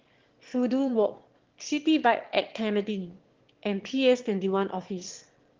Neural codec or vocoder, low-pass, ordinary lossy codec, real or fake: autoencoder, 22.05 kHz, a latent of 192 numbers a frame, VITS, trained on one speaker; 7.2 kHz; Opus, 16 kbps; fake